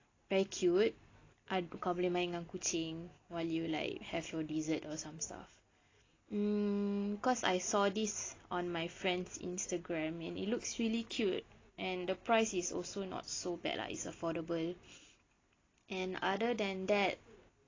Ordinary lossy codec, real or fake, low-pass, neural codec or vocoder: AAC, 32 kbps; real; 7.2 kHz; none